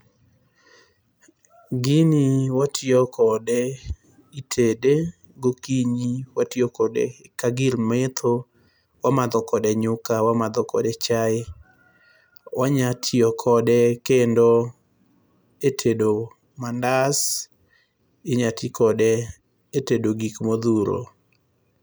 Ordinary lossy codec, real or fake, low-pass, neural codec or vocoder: none; real; none; none